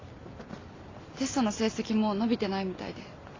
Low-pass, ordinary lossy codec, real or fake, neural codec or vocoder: 7.2 kHz; AAC, 32 kbps; real; none